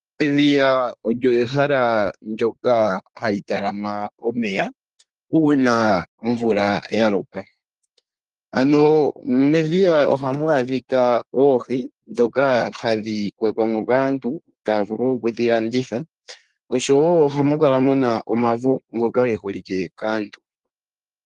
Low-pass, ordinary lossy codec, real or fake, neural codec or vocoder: 10.8 kHz; Opus, 24 kbps; fake; codec, 24 kHz, 1 kbps, SNAC